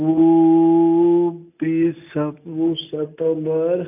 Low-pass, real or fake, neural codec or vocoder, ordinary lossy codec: 3.6 kHz; real; none; none